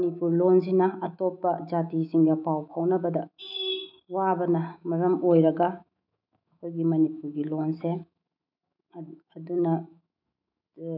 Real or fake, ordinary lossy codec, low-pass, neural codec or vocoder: fake; none; 5.4 kHz; autoencoder, 48 kHz, 128 numbers a frame, DAC-VAE, trained on Japanese speech